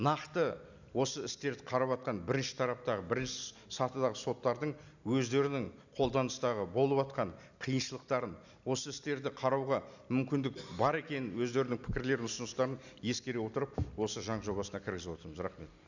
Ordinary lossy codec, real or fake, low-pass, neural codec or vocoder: none; real; 7.2 kHz; none